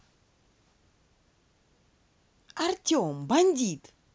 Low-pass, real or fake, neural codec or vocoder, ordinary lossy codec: none; real; none; none